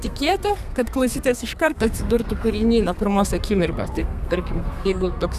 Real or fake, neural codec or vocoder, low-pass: fake; codec, 32 kHz, 1.9 kbps, SNAC; 14.4 kHz